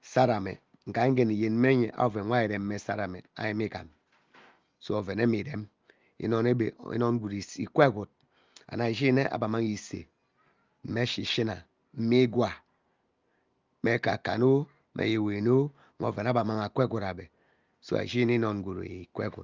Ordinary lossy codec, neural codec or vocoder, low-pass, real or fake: Opus, 32 kbps; none; 7.2 kHz; real